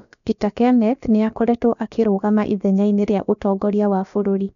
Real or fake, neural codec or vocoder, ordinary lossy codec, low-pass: fake; codec, 16 kHz, about 1 kbps, DyCAST, with the encoder's durations; none; 7.2 kHz